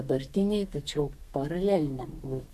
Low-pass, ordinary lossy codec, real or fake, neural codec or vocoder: 14.4 kHz; MP3, 64 kbps; fake; codec, 32 kHz, 1.9 kbps, SNAC